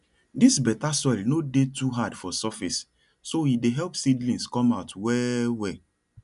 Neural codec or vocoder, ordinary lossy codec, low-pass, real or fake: none; none; 10.8 kHz; real